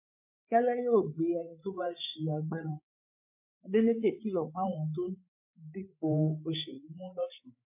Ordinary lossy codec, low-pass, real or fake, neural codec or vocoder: MP3, 24 kbps; 3.6 kHz; fake; codec, 16 kHz, 8 kbps, FreqCodec, larger model